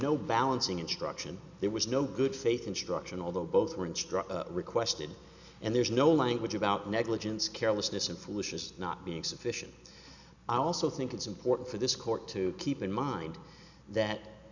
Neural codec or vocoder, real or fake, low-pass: none; real; 7.2 kHz